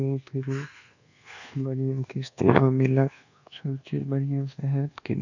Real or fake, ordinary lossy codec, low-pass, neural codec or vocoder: fake; none; 7.2 kHz; codec, 24 kHz, 1.2 kbps, DualCodec